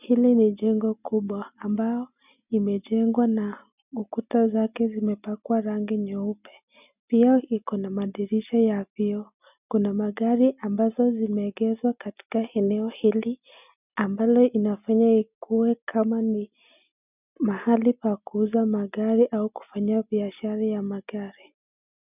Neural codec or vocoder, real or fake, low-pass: none; real; 3.6 kHz